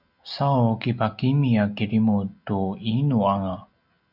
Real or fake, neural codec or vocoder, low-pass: real; none; 5.4 kHz